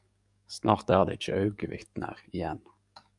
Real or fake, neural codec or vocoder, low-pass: fake; codec, 44.1 kHz, 7.8 kbps, DAC; 10.8 kHz